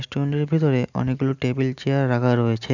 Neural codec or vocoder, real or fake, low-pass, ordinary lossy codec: none; real; 7.2 kHz; none